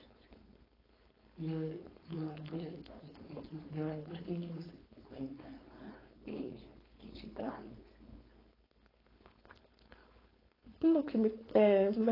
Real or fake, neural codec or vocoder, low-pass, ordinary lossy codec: fake; codec, 16 kHz, 4.8 kbps, FACodec; 5.4 kHz; AAC, 24 kbps